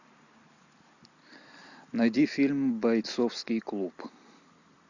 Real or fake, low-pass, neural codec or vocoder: real; 7.2 kHz; none